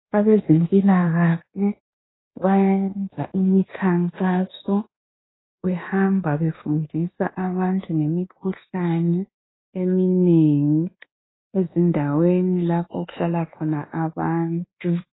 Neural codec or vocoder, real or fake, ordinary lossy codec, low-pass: codec, 24 kHz, 1.2 kbps, DualCodec; fake; AAC, 16 kbps; 7.2 kHz